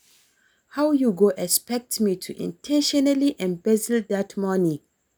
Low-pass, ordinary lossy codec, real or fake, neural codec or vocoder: none; none; real; none